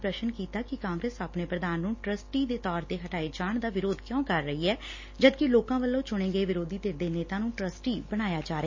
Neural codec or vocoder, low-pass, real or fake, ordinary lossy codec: none; 7.2 kHz; real; MP3, 32 kbps